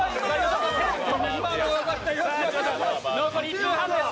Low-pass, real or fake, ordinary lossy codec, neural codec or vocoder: none; real; none; none